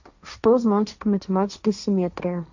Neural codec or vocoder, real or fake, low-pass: codec, 16 kHz, 1.1 kbps, Voila-Tokenizer; fake; 7.2 kHz